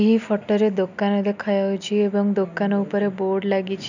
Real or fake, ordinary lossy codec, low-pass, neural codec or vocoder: real; none; 7.2 kHz; none